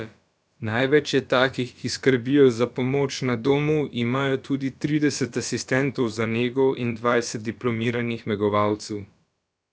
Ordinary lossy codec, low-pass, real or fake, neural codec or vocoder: none; none; fake; codec, 16 kHz, about 1 kbps, DyCAST, with the encoder's durations